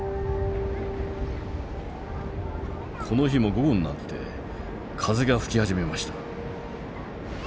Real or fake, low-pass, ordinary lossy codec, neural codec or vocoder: real; none; none; none